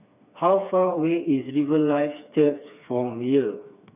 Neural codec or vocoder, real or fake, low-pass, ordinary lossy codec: codec, 16 kHz, 4 kbps, FreqCodec, smaller model; fake; 3.6 kHz; none